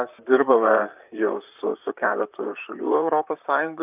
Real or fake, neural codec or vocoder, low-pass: fake; vocoder, 44.1 kHz, 128 mel bands, Pupu-Vocoder; 3.6 kHz